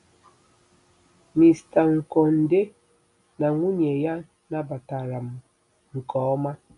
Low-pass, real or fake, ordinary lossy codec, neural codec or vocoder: 10.8 kHz; real; none; none